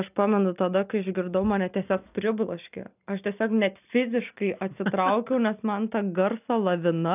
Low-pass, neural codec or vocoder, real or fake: 3.6 kHz; none; real